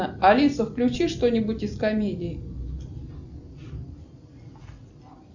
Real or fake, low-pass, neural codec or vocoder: real; 7.2 kHz; none